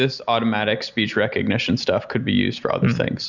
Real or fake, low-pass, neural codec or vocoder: real; 7.2 kHz; none